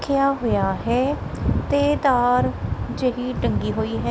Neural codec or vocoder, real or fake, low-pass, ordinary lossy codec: none; real; none; none